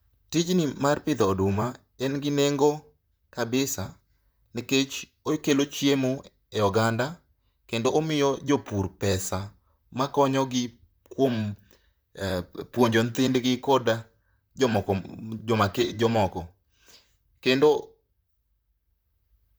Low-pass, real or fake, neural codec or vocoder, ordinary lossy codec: none; fake; vocoder, 44.1 kHz, 128 mel bands, Pupu-Vocoder; none